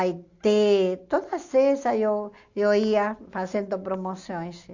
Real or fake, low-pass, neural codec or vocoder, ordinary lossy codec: real; 7.2 kHz; none; Opus, 64 kbps